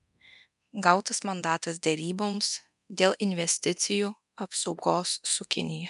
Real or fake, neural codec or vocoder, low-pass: fake; codec, 24 kHz, 0.9 kbps, DualCodec; 10.8 kHz